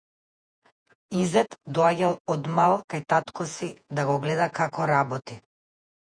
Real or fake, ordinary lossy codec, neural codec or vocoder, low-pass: fake; MP3, 96 kbps; vocoder, 48 kHz, 128 mel bands, Vocos; 9.9 kHz